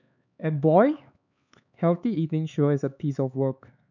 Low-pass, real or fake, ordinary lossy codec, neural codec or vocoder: 7.2 kHz; fake; none; codec, 16 kHz, 4 kbps, X-Codec, HuBERT features, trained on LibriSpeech